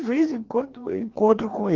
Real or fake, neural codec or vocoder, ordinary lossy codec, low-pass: fake; codec, 16 kHz, 2 kbps, FreqCodec, larger model; Opus, 16 kbps; 7.2 kHz